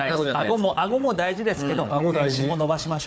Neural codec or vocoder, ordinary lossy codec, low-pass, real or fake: codec, 16 kHz, 4 kbps, FunCodec, trained on Chinese and English, 50 frames a second; none; none; fake